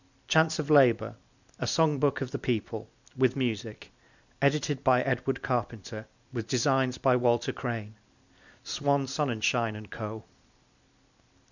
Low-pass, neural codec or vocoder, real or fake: 7.2 kHz; none; real